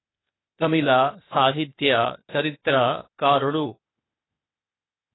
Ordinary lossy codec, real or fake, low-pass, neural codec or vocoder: AAC, 16 kbps; fake; 7.2 kHz; codec, 16 kHz, 0.8 kbps, ZipCodec